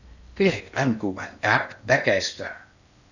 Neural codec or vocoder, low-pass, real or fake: codec, 16 kHz in and 24 kHz out, 0.6 kbps, FocalCodec, streaming, 4096 codes; 7.2 kHz; fake